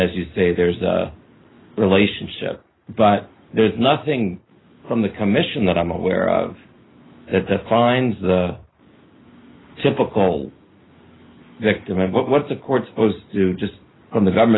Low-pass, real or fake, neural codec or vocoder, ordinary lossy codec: 7.2 kHz; real; none; AAC, 16 kbps